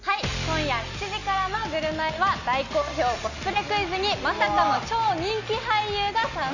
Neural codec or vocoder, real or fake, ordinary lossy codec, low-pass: none; real; none; 7.2 kHz